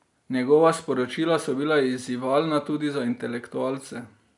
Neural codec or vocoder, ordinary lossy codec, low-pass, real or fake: none; none; 10.8 kHz; real